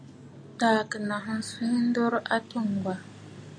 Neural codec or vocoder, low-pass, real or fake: none; 9.9 kHz; real